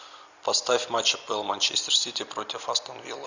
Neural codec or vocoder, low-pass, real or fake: none; 7.2 kHz; real